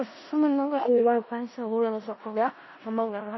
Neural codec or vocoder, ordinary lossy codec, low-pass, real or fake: codec, 16 kHz in and 24 kHz out, 0.4 kbps, LongCat-Audio-Codec, four codebook decoder; MP3, 24 kbps; 7.2 kHz; fake